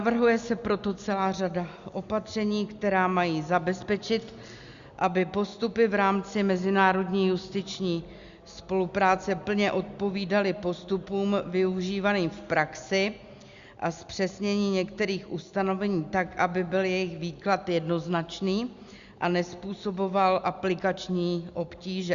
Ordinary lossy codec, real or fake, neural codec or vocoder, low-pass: Opus, 64 kbps; real; none; 7.2 kHz